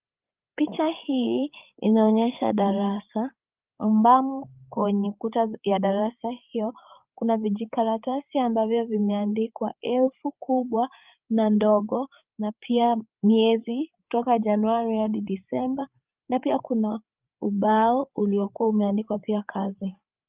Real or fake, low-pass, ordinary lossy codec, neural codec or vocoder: fake; 3.6 kHz; Opus, 24 kbps; codec, 16 kHz, 8 kbps, FreqCodec, larger model